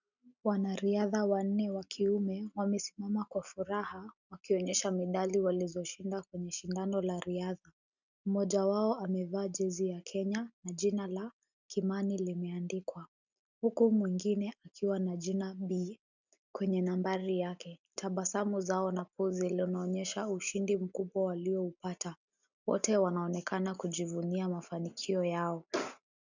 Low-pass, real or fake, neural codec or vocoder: 7.2 kHz; real; none